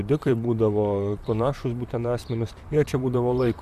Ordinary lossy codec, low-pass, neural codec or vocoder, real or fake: AAC, 96 kbps; 14.4 kHz; vocoder, 44.1 kHz, 128 mel bands, Pupu-Vocoder; fake